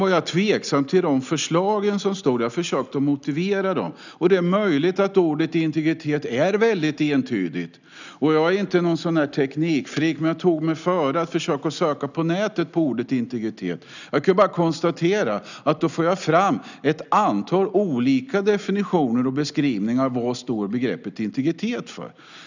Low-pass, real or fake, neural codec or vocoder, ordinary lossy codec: 7.2 kHz; real; none; none